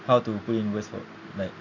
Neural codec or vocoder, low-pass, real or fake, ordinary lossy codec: none; 7.2 kHz; real; none